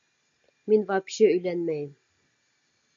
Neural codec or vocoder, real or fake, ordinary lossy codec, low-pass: none; real; AAC, 64 kbps; 7.2 kHz